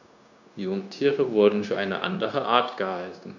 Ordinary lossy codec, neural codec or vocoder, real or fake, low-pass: none; codec, 16 kHz, 0.9 kbps, LongCat-Audio-Codec; fake; 7.2 kHz